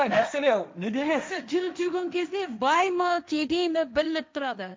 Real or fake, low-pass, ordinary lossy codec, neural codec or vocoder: fake; none; none; codec, 16 kHz, 1.1 kbps, Voila-Tokenizer